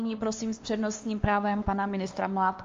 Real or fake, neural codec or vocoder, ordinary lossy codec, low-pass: fake; codec, 16 kHz, 2 kbps, X-Codec, WavLM features, trained on Multilingual LibriSpeech; Opus, 32 kbps; 7.2 kHz